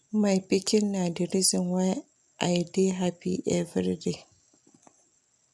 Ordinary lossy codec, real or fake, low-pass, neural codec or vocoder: none; real; none; none